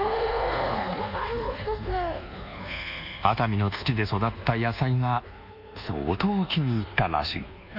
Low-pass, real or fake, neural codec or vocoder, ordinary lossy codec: 5.4 kHz; fake; codec, 24 kHz, 1.2 kbps, DualCodec; none